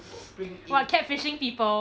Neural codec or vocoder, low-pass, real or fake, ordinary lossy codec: none; none; real; none